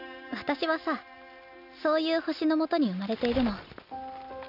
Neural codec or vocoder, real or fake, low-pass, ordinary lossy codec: none; real; 5.4 kHz; none